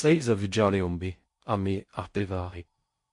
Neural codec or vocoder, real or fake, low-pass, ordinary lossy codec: codec, 16 kHz in and 24 kHz out, 0.6 kbps, FocalCodec, streaming, 4096 codes; fake; 10.8 kHz; MP3, 48 kbps